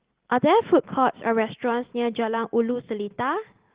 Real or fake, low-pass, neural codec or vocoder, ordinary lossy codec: real; 3.6 kHz; none; Opus, 16 kbps